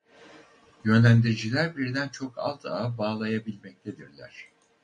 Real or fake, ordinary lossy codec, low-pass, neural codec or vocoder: real; MP3, 48 kbps; 9.9 kHz; none